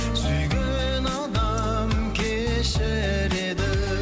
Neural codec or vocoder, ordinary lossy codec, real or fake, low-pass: none; none; real; none